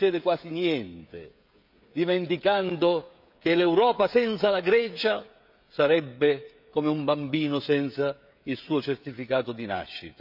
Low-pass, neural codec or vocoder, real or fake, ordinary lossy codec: 5.4 kHz; codec, 16 kHz, 16 kbps, FreqCodec, smaller model; fake; none